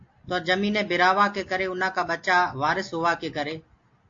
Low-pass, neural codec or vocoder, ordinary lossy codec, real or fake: 7.2 kHz; none; AAC, 48 kbps; real